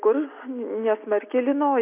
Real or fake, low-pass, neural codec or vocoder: real; 3.6 kHz; none